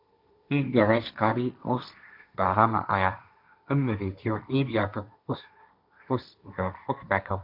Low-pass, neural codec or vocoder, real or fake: 5.4 kHz; codec, 16 kHz, 1.1 kbps, Voila-Tokenizer; fake